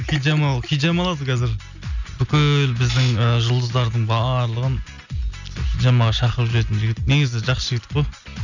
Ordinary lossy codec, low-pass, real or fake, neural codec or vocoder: none; 7.2 kHz; real; none